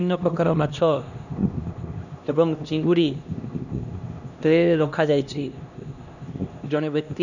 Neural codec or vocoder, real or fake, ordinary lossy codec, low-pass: codec, 16 kHz, 1 kbps, X-Codec, HuBERT features, trained on LibriSpeech; fake; none; 7.2 kHz